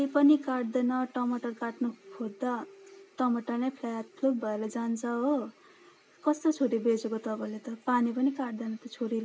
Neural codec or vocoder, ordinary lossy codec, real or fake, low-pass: none; none; real; none